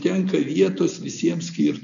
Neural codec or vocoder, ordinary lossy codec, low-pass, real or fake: none; MP3, 96 kbps; 7.2 kHz; real